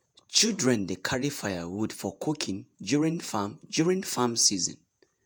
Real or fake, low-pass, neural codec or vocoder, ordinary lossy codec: fake; none; vocoder, 48 kHz, 128 mel bands, Vocos; none